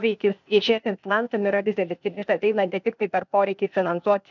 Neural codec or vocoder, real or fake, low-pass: codec, 16 kHz, 0.8 kbps, ZipCodec; fake; 7.2 kHz